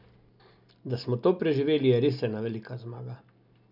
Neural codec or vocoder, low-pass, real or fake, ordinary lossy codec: none; 5.4 kHz; real; none